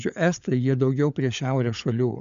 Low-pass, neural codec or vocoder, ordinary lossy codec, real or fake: 7.2 kHz; codec, 16 kHz, 16 kbps, FunCodec, trained on LibriTTS, 50 frames a second; MP3, 96 kbps; fake